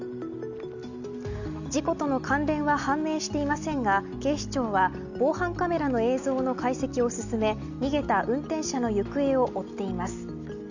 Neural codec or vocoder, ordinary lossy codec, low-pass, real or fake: none; none; 7.2 kHz; real